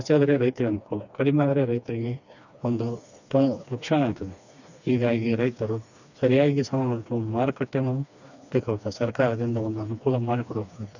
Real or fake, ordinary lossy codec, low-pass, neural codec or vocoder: fake; none; 7.2 kHz; codec, 16 kHz, 2 kbps, FreqCodec, smaller model